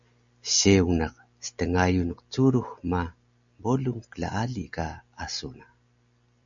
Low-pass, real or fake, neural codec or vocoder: 7.2 kHz; real; none